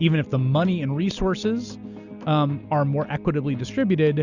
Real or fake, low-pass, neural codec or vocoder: real; 7.2 kHz; none